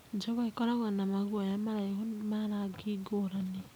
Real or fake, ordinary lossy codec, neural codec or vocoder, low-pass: real; none; none; none